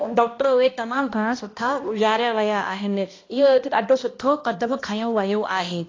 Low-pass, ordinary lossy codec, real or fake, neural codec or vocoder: 7.2 kHz; AAC, 32 kbps; fake; codec, 16 kHz, 1 kbps, X-Codec, HuBERT features, trained on balanced general audio